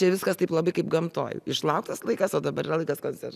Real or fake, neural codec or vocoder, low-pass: real; none; 14.4 kHz